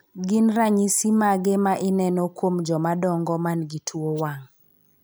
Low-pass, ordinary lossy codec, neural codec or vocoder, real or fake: none; none; none; real